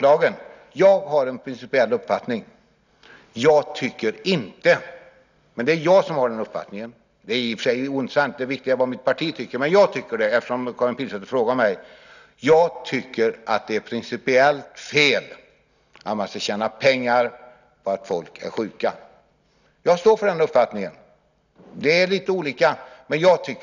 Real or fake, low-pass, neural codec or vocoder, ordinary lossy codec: real; 7.2 kHz; none; none